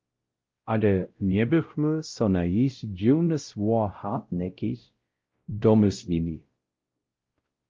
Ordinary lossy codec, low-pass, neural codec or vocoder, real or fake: Opus, 24 kbps; 7.2 kHz; codec, 16 kHz, 0.5 kbps, X-Codec, WavLM features, trained on Multilingual LibriSpeech; fake